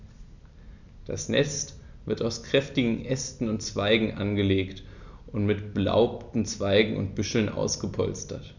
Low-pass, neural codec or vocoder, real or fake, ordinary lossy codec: 7.2 kHz; none; real; none